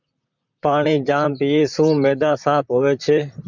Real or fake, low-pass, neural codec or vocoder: fake; 7.2 kHz; vocoder, 44.1 kHz, 128 mel bands, Pupu-Vocoder